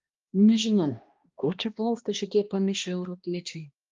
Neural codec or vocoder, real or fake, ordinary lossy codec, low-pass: codec, 16 kHz, 1 kbps, X-Codec, HuBERT features, trained on balanced general audio; fake; Opus, 32 kbps; 7.2 kHz